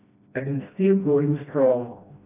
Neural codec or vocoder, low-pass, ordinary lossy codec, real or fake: codec, 16 kHz, 1 kbps, FreqCodec, smaller model; 3.6 kHz; none; fake